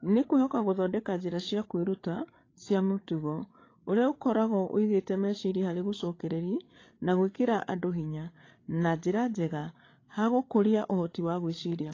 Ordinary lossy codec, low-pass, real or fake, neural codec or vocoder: AAC, 32 kbps; 7.2 kHz; fake; codec, 16 kHz, 8 kbps, FreqCodec, larger model